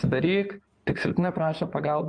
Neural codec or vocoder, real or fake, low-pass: codec, 16 kHz in and 24 kHz out, 2.2 kbps, FireRedTTS-2 codec; fake; 9.9 kHz